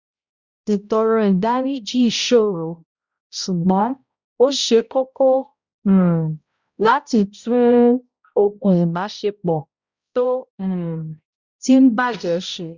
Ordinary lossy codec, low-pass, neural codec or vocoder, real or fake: Opus, 64 kbps; 7.2 kHz; codec, 16 kHz, 0.5 kbps, X-Codec, HuBERT features, trained on balanced general audio; fake